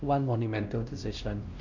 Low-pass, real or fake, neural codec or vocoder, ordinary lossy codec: 7.2 kHz; fake; codec, 16 kHz, 1 kbps, X-Codec, WavLM features, trained on Multilingual LibriSpeech; none